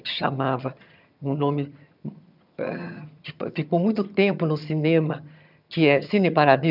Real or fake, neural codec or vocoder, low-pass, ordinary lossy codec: fake; vocoder, 22.05 kHz, 80 mel bands, HiFi-GAN; 5.4 kHz; none